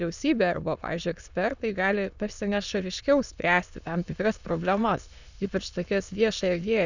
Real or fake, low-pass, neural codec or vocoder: fake; 7.2 kHz; autoencoder, 22.05 kHz, a latent of 192 numbers a frame, VITS, trained on many speakers